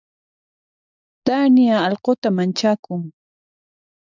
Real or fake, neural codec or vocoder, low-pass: real; none; 7.2 kHz